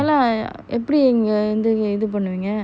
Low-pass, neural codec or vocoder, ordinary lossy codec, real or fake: none; none; none; real